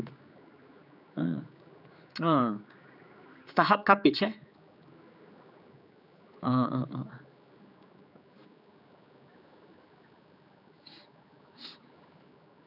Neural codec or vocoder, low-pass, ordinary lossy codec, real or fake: codec, 16 kHz, 4 kbps, X-Codec, HuBERT features, trained on general audio; 5.4 kHz; none; fake